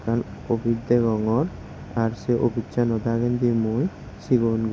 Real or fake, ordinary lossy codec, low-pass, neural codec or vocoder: real; none; none; none